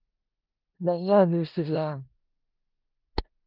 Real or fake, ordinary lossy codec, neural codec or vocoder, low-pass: fake; Opus, 32 kbps; codec, 16 kHz in and 24 kHz out, 0.4 kbps, LongCat-Audio-Codec, four codebook decoder; 5.4 kHz